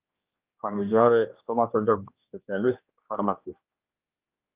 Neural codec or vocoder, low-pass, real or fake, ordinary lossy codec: codec, 16 kHz, 1 kbps, X-Codec, HuBERT features, trained on general audio; 3.6 kHz; fake; Opus, 24 kbps